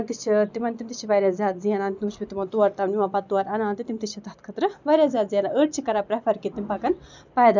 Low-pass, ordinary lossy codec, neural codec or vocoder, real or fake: 7.2 kHz; none; none; real